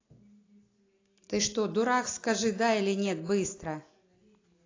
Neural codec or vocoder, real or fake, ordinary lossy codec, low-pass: none; real; AAC, 32 kbps; 7.2 kHz